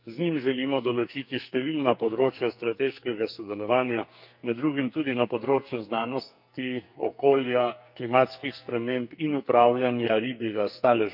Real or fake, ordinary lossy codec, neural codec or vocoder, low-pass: fake; none; codec, 44.1 kHz, 2.6 kbps, SNAC; 5.4 kHz